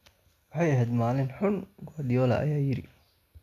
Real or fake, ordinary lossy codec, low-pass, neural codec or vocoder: real; none; 14.4 kHz; none